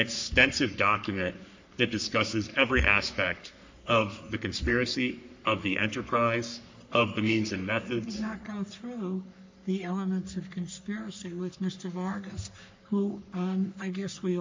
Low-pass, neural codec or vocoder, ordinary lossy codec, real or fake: 7.2 kHz; codec, 44.1 kHz, 3.4 kbps, Pupu-Codec; MP3, 48 kbps; fake